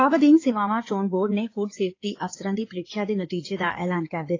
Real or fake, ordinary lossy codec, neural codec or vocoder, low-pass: fake; AAC, 32 kbps; codec, 16 kHz in and 24 kHz out, 2.2 kbps, FireRedTTS-2 codec; 7.2 kHz